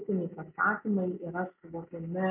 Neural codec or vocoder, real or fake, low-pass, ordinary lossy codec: none; real; 3.6 kHz; MP3, 32 kbps